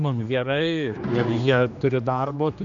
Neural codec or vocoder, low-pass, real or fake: codec, 16 kHz, 2 kbps, X-Codec, HuBERT features, trained on general audio; 7.2 kHz; fake